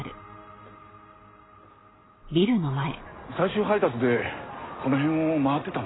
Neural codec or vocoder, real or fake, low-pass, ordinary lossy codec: none; real; 7.2 kHz; AAC, 16 kbps